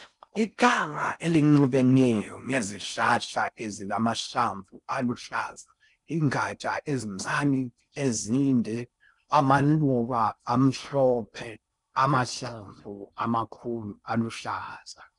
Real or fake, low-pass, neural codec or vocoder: fake; 10.8 kHz; codec, 16 kHz in and 24 kHz out, 0.6 kbps, FocalCodec, streaming, 4096 codes